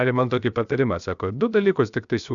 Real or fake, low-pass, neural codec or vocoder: fake; 7.2 kHz; codec, 16 kHz, 0.7 kbps, FocalCodec